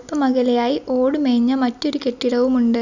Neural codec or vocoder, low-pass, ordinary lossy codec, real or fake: none; 7.2 kHz; none; real